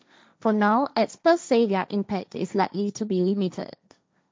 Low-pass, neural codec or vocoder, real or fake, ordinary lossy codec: none; codec, 16 kHz, 1.1 kbps, Voila-Tokenizer; fake; none